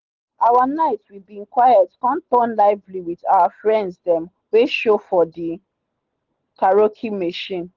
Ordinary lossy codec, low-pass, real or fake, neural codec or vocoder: Opus, 16 kbps; 7.2 kHz; real; none